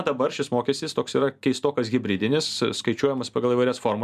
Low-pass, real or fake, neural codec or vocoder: 14.4 kHz; real; none